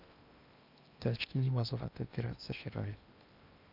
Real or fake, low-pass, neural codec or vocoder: fake; 5.4 kHz; codec, 16 kHz in and 24 kHz out, 0.8 kbps, FocalCodec, streaming, 65536 codes